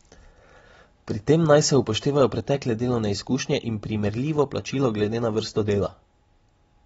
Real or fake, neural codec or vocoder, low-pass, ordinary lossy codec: real; none; 19.8 kHz; AAC, 24 kbps